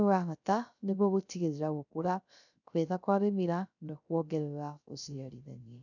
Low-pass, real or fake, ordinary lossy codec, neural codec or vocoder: 7.2 kHz; fake; none; codec, 16 kHz, 0.3 kbps, FocalCodec